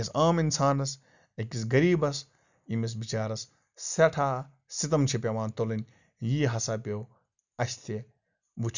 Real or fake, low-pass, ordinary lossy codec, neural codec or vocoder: real; 7.2 kHz; none; none